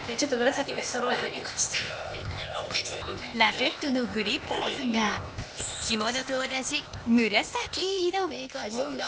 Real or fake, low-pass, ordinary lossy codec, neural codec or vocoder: fake; none; none; codec, 16 kHz, 0.8 kbps, ZipCodec